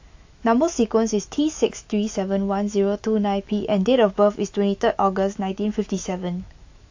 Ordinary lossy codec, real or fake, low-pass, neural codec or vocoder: AAC, 48 kbps; fake; 7.2 kHz; autoencoder, 48 kHz, 128 numbers a frame, DAC-VAE, trained on Japanese speech